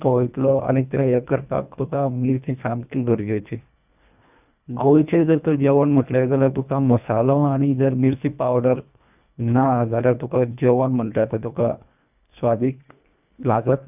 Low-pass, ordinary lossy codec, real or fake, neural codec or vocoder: 3.6 kHz; none; fake; codec, 24 kHz, 1.5 kbps, HILCodec